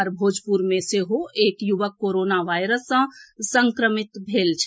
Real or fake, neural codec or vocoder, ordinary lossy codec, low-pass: real; none; none; none